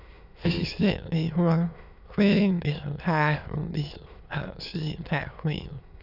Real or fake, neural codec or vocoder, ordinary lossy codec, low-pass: fake; autoencoder, 22.05 kHz, a latent of 192 numbers a frame, VITS, trained on many speakers; none; 5.4 kHz